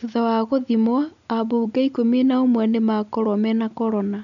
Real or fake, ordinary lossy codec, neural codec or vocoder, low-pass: real; none; none; 7.2 kHz